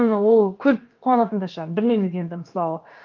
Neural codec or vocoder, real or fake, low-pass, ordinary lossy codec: codec, 16 kHz, about 1 kbps, DyCAST, with the encoder's durations; fake; 7.2 kHz; Opus, 24 kbps